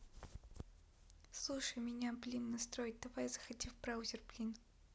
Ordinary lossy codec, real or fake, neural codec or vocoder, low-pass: none; real; none; none